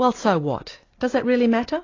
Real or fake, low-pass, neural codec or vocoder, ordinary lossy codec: real; 7.2 kHz; none; AAC, 32 kbps